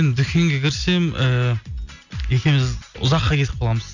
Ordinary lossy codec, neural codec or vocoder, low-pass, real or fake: none; none; 7.2 kHz; real